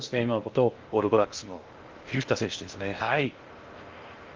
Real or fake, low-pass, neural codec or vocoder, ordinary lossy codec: fake; 7.2 kHz; codec, 16 kHz in and 24 kHz out, 0.6 kbps, FocalCodec, streaming, 2048 codes; Opus, 32 kbps